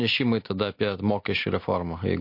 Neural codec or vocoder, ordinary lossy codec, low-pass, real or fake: none; MP3, 32 kbps; 5.4 kHz; real